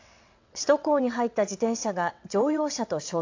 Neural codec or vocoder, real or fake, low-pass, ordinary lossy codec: vocoder, 22.05 kHz, 80 mel bands, WaveNeXt; fake; 7.2 kHz; AAC, 48 kbps